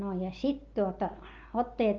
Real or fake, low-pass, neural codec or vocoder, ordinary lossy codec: real; 7.2 kHz; none; Opus, 24 kbps